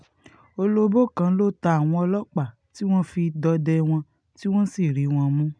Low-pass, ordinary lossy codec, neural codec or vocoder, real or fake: 9.9 kHz; none; none; real